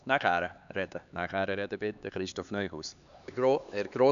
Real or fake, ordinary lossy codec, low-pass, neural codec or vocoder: fake; none; 7.2 kHz; codec, 16 kHz, 4 kbps, X-Codec, HuBERT features, trained on LibriSpeech